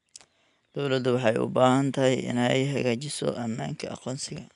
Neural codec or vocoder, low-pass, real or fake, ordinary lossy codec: none; 10.8 kHz; real; none